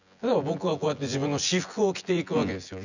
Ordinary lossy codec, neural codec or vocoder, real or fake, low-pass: none; vocoder, 24 kHz, 100 mel bands, Vocos; fake; 7.2 kHz